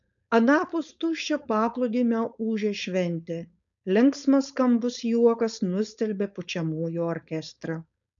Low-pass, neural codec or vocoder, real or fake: 7.2 kHz; codec, 16 kHz, 4.8 kbps, FACodec; fake